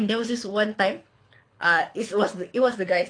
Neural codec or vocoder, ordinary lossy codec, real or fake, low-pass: codec, 24 kHz, 6 kbps, HILCodec; AAC, 48 kbps; fake; 9.9 kHz